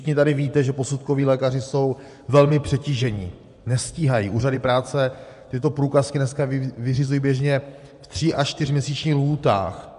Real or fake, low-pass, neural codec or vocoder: fake; 10.8 kHz; vocoder, 24 kHz, 100 mel bands, Vocos